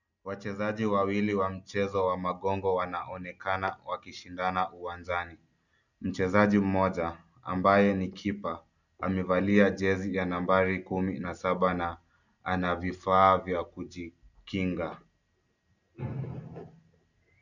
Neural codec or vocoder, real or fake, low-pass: none; real; 7.2 kHz